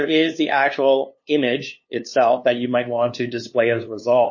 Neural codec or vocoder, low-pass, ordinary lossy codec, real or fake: codec, 16 kHz, 2 kbps, FunCodec, trained on LibriTTS, 25 frames a second; 7.2 kHz; MP3, 32 kbps; fake